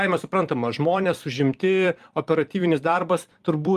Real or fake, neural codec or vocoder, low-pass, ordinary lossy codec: fake; vocoder, 44.1 kHz, 128 mel bands every 256 samples, BigVGAN v2; 14.4 kHz; Opus, 32 kbps